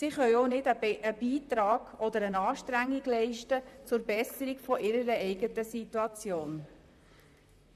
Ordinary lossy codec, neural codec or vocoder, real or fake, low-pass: MP3, 96 kbps; vocoder, 44.1 kHz, 128 mel bands, Pupu-Vocoder; fake; 14.4 kHz